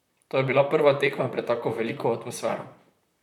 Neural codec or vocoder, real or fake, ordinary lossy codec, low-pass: vocoder, 44.1 kHz, 128 mel bands, Pupu-Vocoder; fake; none; 19.8 kHz